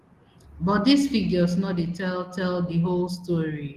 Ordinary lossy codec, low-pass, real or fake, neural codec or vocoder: Opus, 16 kbps; 14.4 kHz; real; none